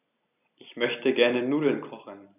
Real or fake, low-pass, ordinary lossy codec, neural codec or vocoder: real; 3.6 kHz; none; none